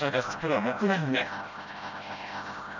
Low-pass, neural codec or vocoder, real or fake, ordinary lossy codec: 7.2 kHz; codec, 16 kHz, 0.5 kbps, FreqCodec, smaller model; fake; none